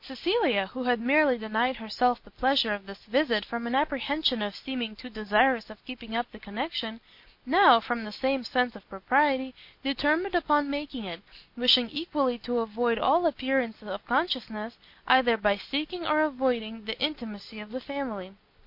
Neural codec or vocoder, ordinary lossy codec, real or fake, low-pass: none; MP3, 32 kbps; real; 5.4 kHz